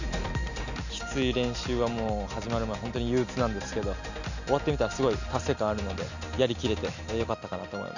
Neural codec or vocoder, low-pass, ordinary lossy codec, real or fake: none; 7.2 kHz; none; real